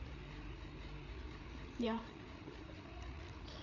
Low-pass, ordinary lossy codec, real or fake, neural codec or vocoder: 7.2 kHz; none; fake; codec, 16 kHz, 8 kbps, FreqCodec, larger model